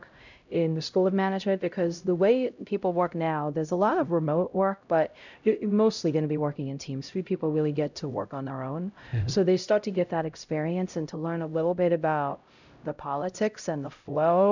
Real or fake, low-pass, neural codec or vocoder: fake; 7.2 kHz; codec, 16 kHz, 0.5 kbps, X-Codec, HuBERT features, trained on LibriSpeech